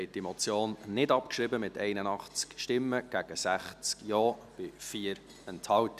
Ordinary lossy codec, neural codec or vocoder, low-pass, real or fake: none; none; 14.4 kHz; real